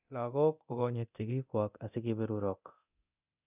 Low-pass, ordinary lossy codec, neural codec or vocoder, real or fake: 3.6 kHz; none; codec, 24 kHz, 0.9 kbps, DualCodec; fake